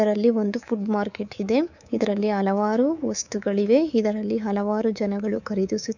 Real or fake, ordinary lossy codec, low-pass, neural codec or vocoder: fake; none; 7.2 kHz; codec, 24 kHz, 3.1 kbps, DualCodec